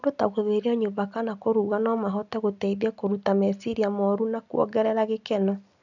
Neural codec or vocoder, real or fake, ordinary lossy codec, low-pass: none; real; none; 7.2 kHz